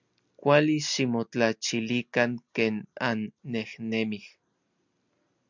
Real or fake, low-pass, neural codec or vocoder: real; 7.2 kHz; none